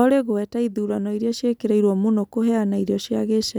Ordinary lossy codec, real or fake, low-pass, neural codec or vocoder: none; real; none; none